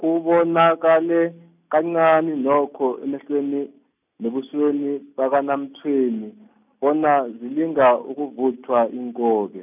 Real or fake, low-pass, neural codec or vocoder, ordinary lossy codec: real; 3.6 kHz; none; none